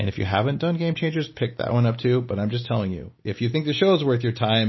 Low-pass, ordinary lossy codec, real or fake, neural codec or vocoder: 7.2 kHz; MP3, 24 kbps; real; none